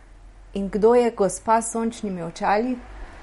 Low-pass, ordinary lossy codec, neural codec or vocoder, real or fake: 19.8 kHz; MP3, 48 kbps; none; real